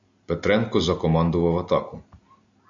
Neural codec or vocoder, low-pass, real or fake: none; 7.2 kHz; real